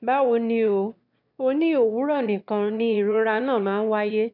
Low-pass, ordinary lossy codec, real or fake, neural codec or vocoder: 5.4 kHz; none; fake; autoencoder, 22.05 kHz, a latent of 192 numbers a frame, VITS, trained on one speaker